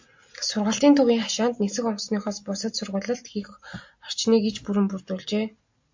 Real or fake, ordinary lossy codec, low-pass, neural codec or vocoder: real; MP3, 48 kbps; 7.2 kHz; none